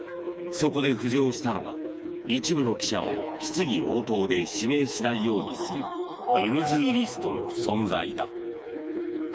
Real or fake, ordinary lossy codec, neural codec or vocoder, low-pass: fake; none; codec, 16 kHz, 2 kbps, FreqCodec, smaller model; none